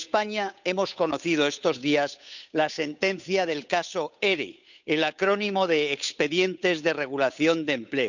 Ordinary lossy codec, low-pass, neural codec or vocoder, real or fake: none; 7.2 kHz; codec, 16 kHz, 8 kbps, FunCodec, trained on Chinese and English, 25 frames a second; fake